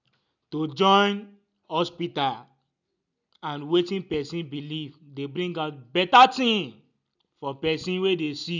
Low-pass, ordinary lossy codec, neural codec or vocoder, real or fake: 7.2 kHz; none; none; real